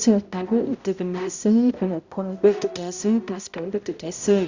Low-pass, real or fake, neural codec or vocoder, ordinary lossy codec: 7.2 kHz; fake; codec, 16 kHz, 0.5 kbps, X-Codec, HuBERT features, trained on balanced general audio; Opus, 64 kbps